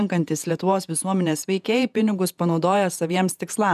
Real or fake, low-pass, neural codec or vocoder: fake; 14.4 kHz; vocoder, 48 kHz, 128 mel bands, Vocos